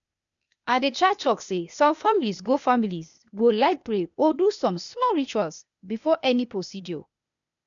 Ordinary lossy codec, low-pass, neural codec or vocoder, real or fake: none; 7.2 kHz; codec, 16 kHz, 0.8 kbps, ZipCodec; fake